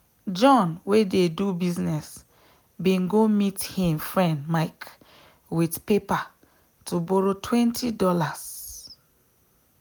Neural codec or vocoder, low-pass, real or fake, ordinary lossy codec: none; none; real; none